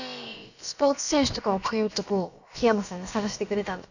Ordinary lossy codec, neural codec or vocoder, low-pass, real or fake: none; codec, 16 kHz, about 1 kbps, DyCAST, with the encoder's durations; 7.2 kHz; fake